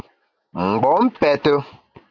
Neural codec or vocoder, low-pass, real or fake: none; 7.2 kHz; real